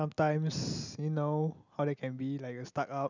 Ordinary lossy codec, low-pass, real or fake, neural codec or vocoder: none; 7.2 kHz; real; none